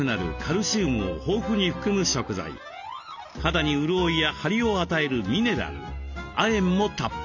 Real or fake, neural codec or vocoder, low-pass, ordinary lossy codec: real; none; 7.2 kHz; none